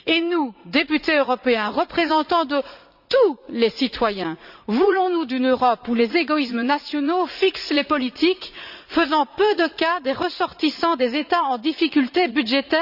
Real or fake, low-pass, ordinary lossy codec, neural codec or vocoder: fake; 5.4 kHz; Opus, 64 kbps; vocoder, 44.1 kHz, 80 mel bands, Vocos